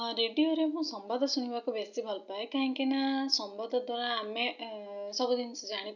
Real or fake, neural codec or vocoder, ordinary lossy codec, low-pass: real; none; none; 7.2 kHz